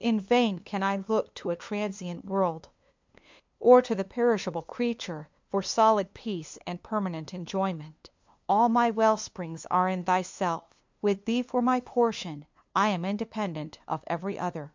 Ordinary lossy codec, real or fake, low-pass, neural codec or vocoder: MP3, 64 kbps; fake; 7.2 kHz; codec, 16 kHz, 2 kbps, FunCodec, trained on LibriTTS, 25 frames a second